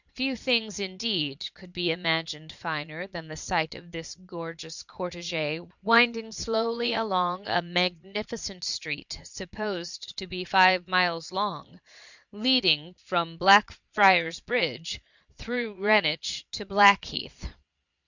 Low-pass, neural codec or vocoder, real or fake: 7.2 kHz; vocoder, 22.05 kHz, 80 mel bands, Vocos; fake